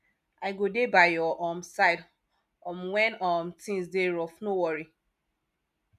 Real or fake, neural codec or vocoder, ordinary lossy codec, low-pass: real; none; none; 14.4 kHz